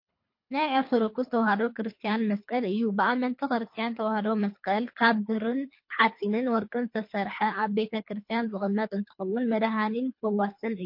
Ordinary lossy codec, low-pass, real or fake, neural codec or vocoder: MP3, 32 kbps; 5.4 kHz; fake; codec, 24 kHz, 3 kbps, HILCodec